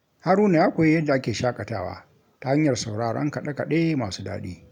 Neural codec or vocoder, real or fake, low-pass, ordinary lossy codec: none; real; 19.8 kHz; none